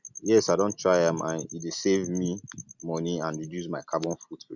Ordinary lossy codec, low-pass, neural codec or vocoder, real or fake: none; 7.2 kHz; none; real